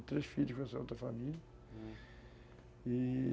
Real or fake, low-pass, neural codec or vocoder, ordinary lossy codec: real; none; none; none